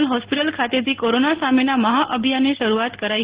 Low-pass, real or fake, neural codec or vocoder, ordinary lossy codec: 3.6 kHz; real; none; Opus, 16 kbps